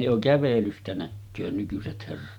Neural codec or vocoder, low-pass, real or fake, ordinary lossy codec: vocoder, 44.1 kHz, 128 mel bands every 512 samples, BigVGAN v2; 19.8 kHz; fake; none